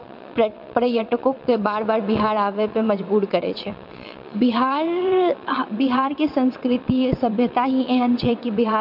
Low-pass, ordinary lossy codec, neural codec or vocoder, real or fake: 5.4 kHz; MP3, 48 kbps; vocoder, 22.05 kHz, 80 mel bands, WaveNeXt; fake